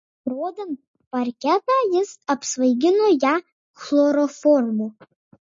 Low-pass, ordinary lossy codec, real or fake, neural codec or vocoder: 7.2 kHz; MP3, 32 kbps; real; none